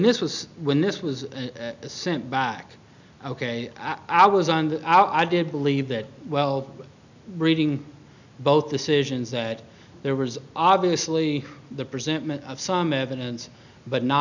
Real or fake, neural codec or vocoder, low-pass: real; none; 7.2 kHz